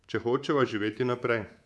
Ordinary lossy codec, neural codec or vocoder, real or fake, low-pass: none; codec, 24 kHz, 3.1 kbps, DualCodec; fake; none